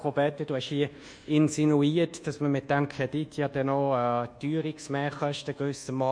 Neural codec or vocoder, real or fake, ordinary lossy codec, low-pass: codec, 24 kHz, 1.2 kbps, DualCodec; fake; none; 9.9 kHz